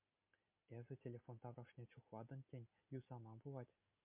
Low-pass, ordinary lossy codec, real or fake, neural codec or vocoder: 3.6 kHz; MP3, 32 kbps; real; none